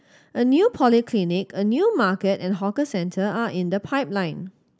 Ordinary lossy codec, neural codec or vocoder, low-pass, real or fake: none; none; none; real